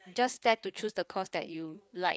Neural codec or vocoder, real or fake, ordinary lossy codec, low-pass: codec, 16 kHz, 4 kbps, FreqCodec, larger model; fake; none; none